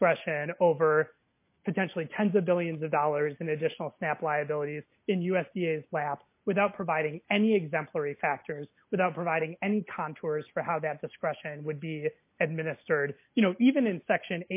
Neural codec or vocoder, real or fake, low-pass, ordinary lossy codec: none; real; 3.6 kHz; MP3, 24 kbps